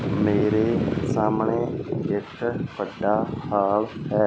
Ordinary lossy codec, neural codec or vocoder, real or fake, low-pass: none; none; real; none